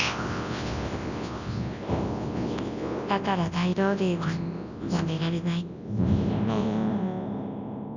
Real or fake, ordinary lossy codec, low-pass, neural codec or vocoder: fake; none; 7.2 kHz; codec, 24 kHz, 0.9 kbps, WavTokenizer, large speech release